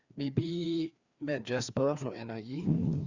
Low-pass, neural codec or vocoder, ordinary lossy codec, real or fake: 7.2 kHz; codec, 16 kHz, 2 kbps, FreqCodec, larger model; Opus, 64 kbps; fake